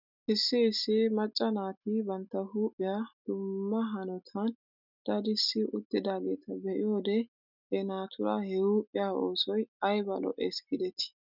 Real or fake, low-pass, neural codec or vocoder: real; 5.4 kHz; none